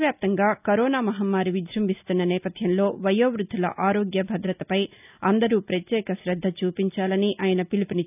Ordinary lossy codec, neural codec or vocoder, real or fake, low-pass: none; none; real; 3.6 kHz